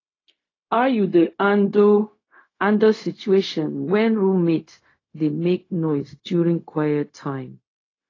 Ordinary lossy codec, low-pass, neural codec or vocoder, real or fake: AAC, 32 kbps; 7.2 kHz; codec, 16 kHz, 0.4 kbps, LongCat-Audio-Codec; fake